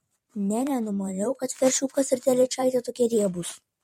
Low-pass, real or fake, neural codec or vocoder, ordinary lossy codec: 19.8 kHz; fake; vocoder, 44.1 kHz, 128 mel bands every 512 samples, BigVGAN v2; MP3, 64 kbps